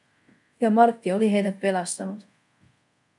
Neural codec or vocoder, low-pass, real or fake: codec, 24 kHz, 0.5 kbps, DualCodec; 10.8 kHz; fake